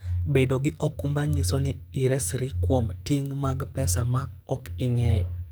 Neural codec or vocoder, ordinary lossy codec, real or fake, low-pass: codec, 44.1 kHz, 2.6 kbps, SNAC; none; fake; none